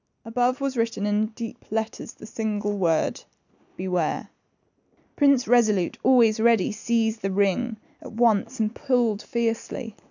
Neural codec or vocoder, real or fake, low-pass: none; real; 7.2 kHz